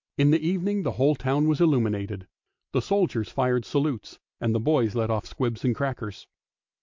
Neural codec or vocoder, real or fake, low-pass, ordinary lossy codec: none; real; 7.2 kHz; MP3, 48 kbps